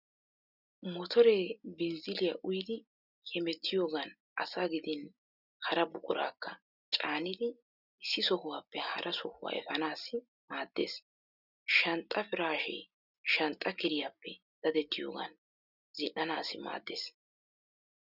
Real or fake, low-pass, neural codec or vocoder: real; 5.4 kHz; none